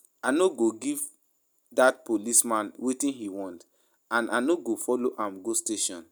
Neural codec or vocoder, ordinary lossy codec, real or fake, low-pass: none; none; real; none